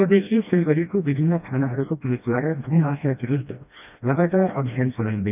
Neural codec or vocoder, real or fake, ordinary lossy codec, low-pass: codec, 16 kHz, 1 kbps, FreqCodec, smaller model; fake; none; 3.6 kHz